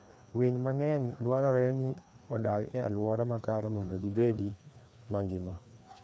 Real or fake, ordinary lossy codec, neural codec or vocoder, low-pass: fake; none; codec, 16 kHz, 2 kbps, FreqCodec, larger model; none